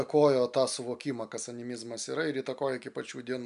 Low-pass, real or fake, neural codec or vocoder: 10.8 kHz; real; none